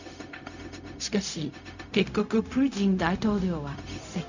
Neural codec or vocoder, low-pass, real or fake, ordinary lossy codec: codec, 16 kHz, 0.4 kbps, LongCat-Audio-Codec; 7.2 kHz; fake; none